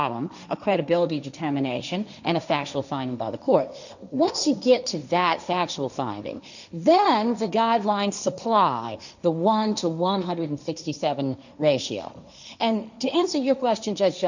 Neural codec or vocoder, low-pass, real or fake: codec, 16 kHz, 1.1 kbps, Voila-Tokenizer; 7.2 kHz; fake